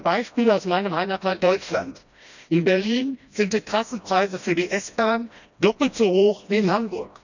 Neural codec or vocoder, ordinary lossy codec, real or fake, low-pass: codec, 16 kHz, 1 kbps, FreqCodec, smaller model; none; fake; 7.2 kHz